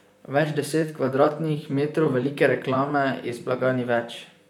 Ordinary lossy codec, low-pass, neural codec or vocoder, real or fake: none; 19.8 kHz; vocoder, 44.1 kHz, 128 mel bands, Pupu-Vocoder; fake